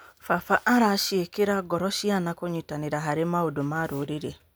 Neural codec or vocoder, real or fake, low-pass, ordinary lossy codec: none; real; none; none